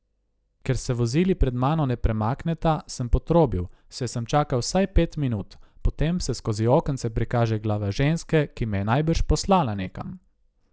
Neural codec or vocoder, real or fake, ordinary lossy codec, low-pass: none; real; none; none